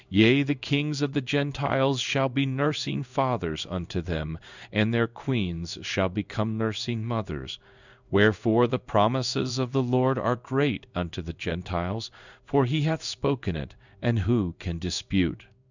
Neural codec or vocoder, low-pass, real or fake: codec, 16 kHz in and 24 kHz out, 1 kbps, XY-Tokenizer; 7.2 kHz; fake